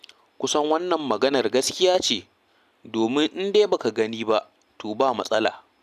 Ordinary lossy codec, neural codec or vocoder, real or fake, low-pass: none; none; real; 14.4 kHz